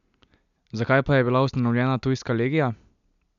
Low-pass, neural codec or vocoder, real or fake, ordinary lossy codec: 7.2 kHz; none; real; none